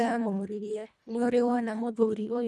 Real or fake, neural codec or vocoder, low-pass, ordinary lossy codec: fake; codec, 24 kHz, 1.5 kbps, HILCodec; none; none